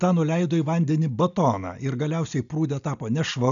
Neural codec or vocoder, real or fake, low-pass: none; real; 7.2 kHz